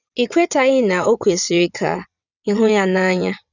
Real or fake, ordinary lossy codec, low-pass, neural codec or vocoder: fake; none; 7.2 kHz; vocoder, 22.05 kHz, 80 mel bands, WaveNeXt